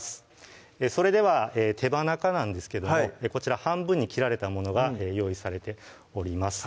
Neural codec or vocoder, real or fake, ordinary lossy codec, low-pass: none; real; none; none